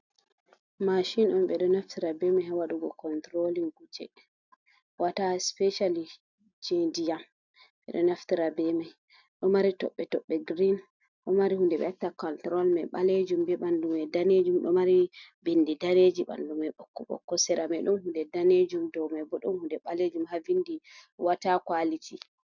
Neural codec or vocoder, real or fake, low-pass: none; real; 7.2 kHz